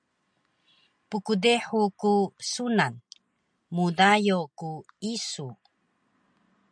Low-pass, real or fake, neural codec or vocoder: 9.9 kHz; real; none